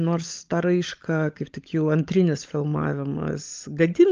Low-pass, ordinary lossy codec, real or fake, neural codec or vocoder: 7.2 kHz; Opus, 24 kbps; fake; codec, 16 kHz, 16 kbps, FunCodec, trained on LibriTTS, 50 frames a second